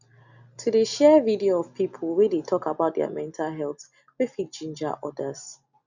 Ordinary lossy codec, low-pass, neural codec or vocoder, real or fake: none; 7.2 kHz; none; real